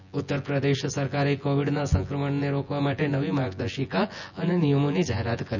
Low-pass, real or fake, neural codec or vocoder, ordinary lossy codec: 7.2 kHz; fake; vocoder, 24 kHz, 100 mel bands, Vocos; none